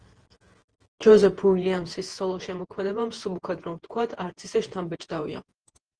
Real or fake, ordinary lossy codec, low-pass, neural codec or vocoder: fake; Opus, 16 kbps; 9.9 kHz; vocoder, 48 kHz, 128 mel bands, Vocos